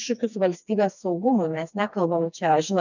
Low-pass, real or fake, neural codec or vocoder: 7.2 kHz; fake; codec, 16 kHz, 2 kbps, FreqCodec, smaller model